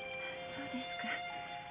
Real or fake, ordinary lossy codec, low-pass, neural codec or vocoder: real; Opus, 64 kbps; 3.6 kHz; none